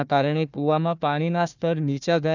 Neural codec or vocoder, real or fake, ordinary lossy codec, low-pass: codec, 16 kHz, 1 kbps, FunCodec, trained on Chinese and English, 50 frames a second; fake; none; 7.2 kHz